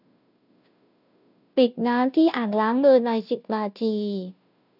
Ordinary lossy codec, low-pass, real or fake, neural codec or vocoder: none; 5.4 kHz; fake; codec, 16 kHz, 0.5 kbps, FunCodec, trained on Chinese and English, 25 frames a second